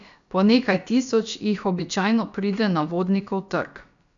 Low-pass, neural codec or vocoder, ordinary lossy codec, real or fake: 7.2 kHz; codec, 16 kHz, about 1 kbps, DyCAST, with the encoder's durations; none; fake